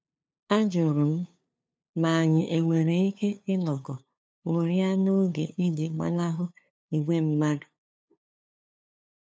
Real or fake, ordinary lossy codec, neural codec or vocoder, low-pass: fake; none; codec, 16 kHz, 2 kbps, FunCodec, trained on LibriTTS, 25 frames a second; none